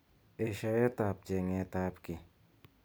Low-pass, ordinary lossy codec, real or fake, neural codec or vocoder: none; none; fake; vocoder, 44.1 kHz, 128 mel bands every 256 samples, BigVGAN v2